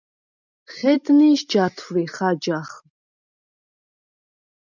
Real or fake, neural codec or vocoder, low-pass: real; none; 7.2 kHz